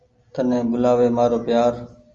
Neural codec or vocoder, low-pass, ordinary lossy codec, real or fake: none; 7.2 kHz; Opus, 32 kbps; real